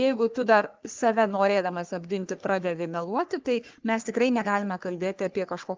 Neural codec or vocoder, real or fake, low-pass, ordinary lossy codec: codec, 44.1 kHz, 3.4 kbps, Pupu-Codec; fake; 7.2 kHz; Opus, 32 kbps